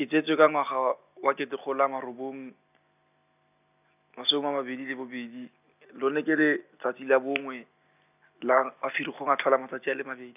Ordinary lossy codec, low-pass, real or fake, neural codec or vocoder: none; 3.6 kHz; real; none